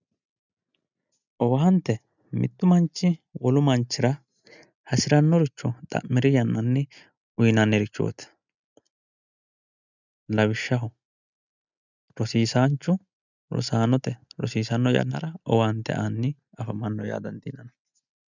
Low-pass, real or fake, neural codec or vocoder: 7.2 kHz; real; none